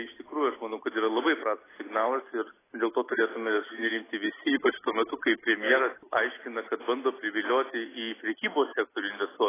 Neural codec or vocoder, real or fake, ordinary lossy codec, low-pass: none; real; AAC, 16 kbps; 3.6 kHz